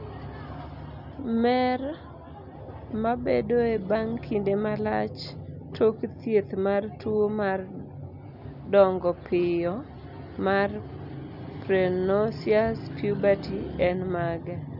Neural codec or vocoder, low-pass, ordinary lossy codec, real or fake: none; 5.4 kHz; none; real